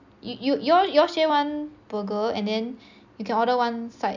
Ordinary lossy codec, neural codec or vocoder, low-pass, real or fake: none; none; 7.2 kHz; real